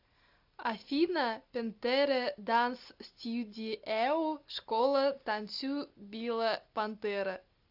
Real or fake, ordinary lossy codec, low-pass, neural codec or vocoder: real; AAC, 48 kbps; 5.4 kHz; none